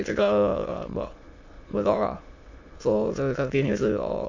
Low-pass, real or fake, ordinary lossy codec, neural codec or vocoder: 7.2 kHz; fake; AAC, 48 kbps; autoencoder, 22.05 kHz, a latent of 192 numbers a frame, VITS, trained on many speakers